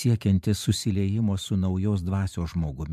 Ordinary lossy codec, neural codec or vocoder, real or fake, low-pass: MP3, 96 kbps; vocoder, 44.1 kHz, 128 mel bands every 512 samples, BigVGAN v2; fake; 14.4 kHz